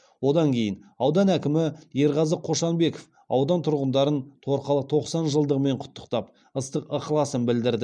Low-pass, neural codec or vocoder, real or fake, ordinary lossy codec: 9.9 kHz; none; real; none